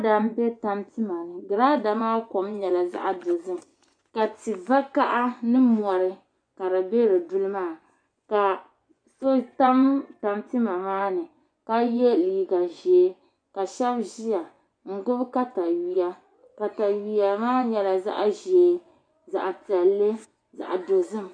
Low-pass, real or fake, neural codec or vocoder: 9.9 kHz; fake; vocoder, 24 kHz, 100 mel bands, Vocos